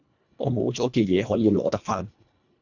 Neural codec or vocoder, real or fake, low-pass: codec, 24 kHz, 1.5 kbps, HILCodec; fake; 7.2 kHz